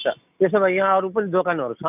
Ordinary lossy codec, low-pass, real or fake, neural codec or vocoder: none; 3.6 kHz; real; none